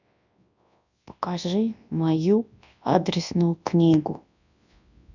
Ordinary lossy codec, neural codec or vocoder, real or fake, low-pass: none; codec, 24 kHz, 0.9 kbps, WavTokenizer, large speech release; fake; 7.2 kHz